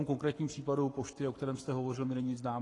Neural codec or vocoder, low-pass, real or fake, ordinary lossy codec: codec, 44.1 kHz, 7.8 kbps, Pupu-Codec; 10.8 kHz; fake; AAC, 32 kbps